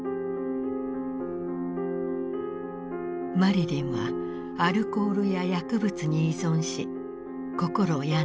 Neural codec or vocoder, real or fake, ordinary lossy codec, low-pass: none; real; none; none